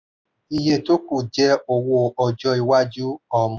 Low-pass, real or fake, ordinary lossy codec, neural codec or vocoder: none; real; none; none